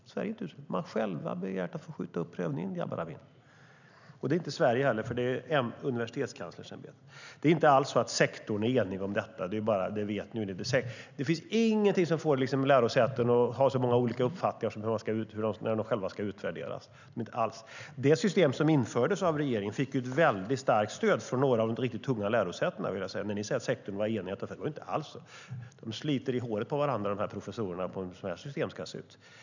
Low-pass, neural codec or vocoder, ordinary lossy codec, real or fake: 7.2 kHz; none; none; real